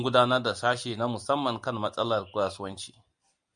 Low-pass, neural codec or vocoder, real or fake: 9.9 kHz; none; real